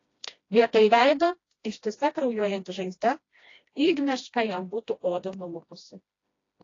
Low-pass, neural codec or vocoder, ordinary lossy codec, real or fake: 7.2 kHz; codec, 16 kHz, 1 kbps, FreqCodec, smaller model; AAC, 32 kbps; fake